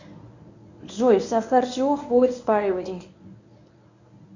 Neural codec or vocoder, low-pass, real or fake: codec, 24 kHz, 0.9 kbps, WavTokenizer, medium speech release version 1; 7.2 kHz; fake